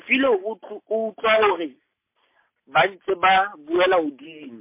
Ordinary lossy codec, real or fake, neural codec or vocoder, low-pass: MP3, 24 kbps; real; none; 3.6 kHz